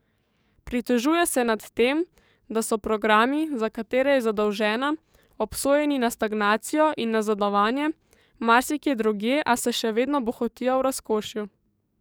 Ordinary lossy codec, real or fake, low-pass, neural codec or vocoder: none; fake; none; codec, 44.1 kHz, 7.8 kbps, DAC